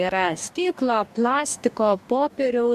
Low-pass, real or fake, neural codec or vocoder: 14.4 kHz; fake; codec, 44.1 kHz, 2.6 kbps, DAC